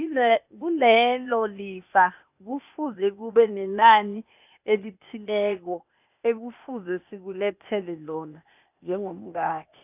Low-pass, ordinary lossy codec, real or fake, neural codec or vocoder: 3.6 kHz; none; fake; codec, 16 kHz, 0.8 kbps, ZipCodec